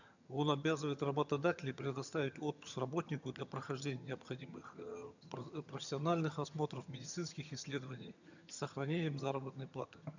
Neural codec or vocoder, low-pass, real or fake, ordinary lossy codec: vocoder, 22.05 kHz, 80 mel bands, HiFi-GAN; 7.2 kHz; fake; none